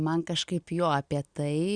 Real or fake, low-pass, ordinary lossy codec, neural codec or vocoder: real; 9.9 kHz; Opus, 64 kbps; none